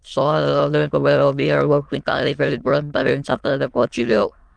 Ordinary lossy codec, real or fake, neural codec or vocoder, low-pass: Opus, 24 kbps; fake; autoencoder, 22.05 kHz, a latent of 192 numbers a frame, VITS, trained on many speakers; 9.9 kHz